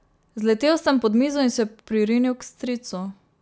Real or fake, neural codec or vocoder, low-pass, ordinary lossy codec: real; none; none; none